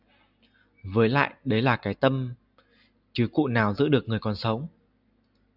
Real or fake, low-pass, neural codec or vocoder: real; 5.4 kHz; none